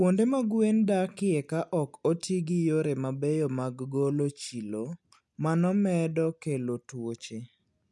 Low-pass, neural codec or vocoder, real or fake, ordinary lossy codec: none; none; real; none